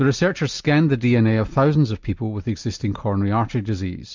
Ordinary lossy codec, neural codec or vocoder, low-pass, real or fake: MP3, 64 kbps; none; 7.2 kHz; real